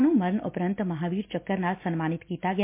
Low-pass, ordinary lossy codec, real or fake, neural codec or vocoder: 3.6 kHz; MP3, 24 kbps; real; none